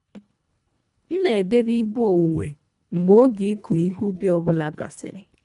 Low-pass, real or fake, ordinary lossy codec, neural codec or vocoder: 10.8 kHz; fake; none; codec, 24 kHz, 1.5 kbps, HILCodec